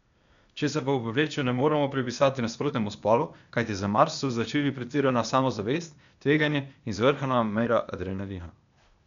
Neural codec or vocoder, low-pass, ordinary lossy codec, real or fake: codec, 16 kHz, 0.8 kbps, ZipCodec; 7.2 kHz; none; fake